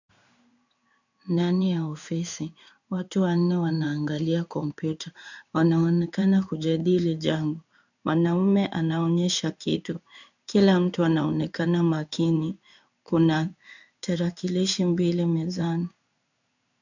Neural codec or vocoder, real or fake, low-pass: codec, 16 kHz in and 24 kHz out, 1 kbps, XY-Tokenizer; fake; 7.2 kHz